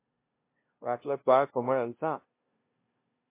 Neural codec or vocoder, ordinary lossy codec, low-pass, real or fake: codec, 16 kHz, 0.5 kbps, FunCodec, trained on LibriTTS, 25 frames a second; MP3, 24 kbps; 3.6 kHz; fake